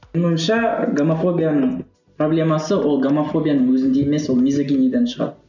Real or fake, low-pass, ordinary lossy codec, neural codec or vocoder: real; 7.2 kHz; none; none